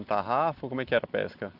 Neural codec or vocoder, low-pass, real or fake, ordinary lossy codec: none; 5.4 kHz; real; none